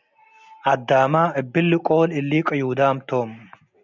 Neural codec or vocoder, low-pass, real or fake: none; 7.2 kHz; real